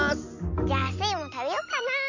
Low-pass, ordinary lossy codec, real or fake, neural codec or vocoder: 7.2 kHz; none; real; none